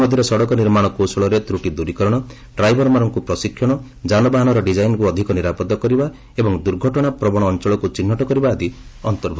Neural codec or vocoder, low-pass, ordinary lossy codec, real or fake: none; none; none; real